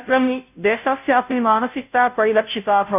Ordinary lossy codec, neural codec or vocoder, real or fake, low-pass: none; codec, 16 kHz, 0.5 kbps, FunCodec, trained on Chinese and English, 25 frames a second; fake; 3.6 kHz